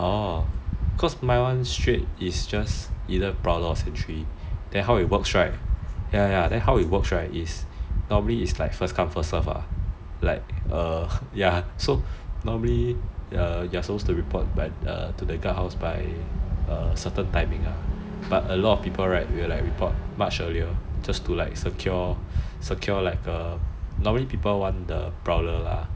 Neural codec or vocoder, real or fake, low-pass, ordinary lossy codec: none; real; none; none